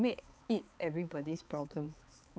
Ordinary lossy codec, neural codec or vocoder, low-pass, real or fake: none; codec, 16 kHz, 4 kbps, X-Codec, HuBERT features, trained on balanced general audio; none; fake